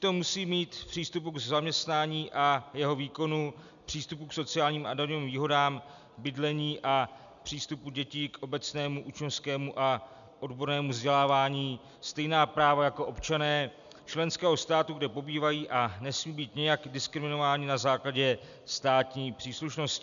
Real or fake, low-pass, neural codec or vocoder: real; 7.2 kHz; none